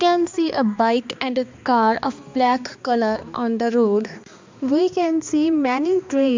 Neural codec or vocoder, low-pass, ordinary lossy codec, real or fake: codec, 16 kHz, 2 kbps, X-Codec, HuBERT features, trained on balanced general audio; 7.2 kHz; MP3, 64 kbps; fake